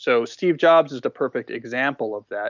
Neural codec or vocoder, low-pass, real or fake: none; 7.2 kHz; real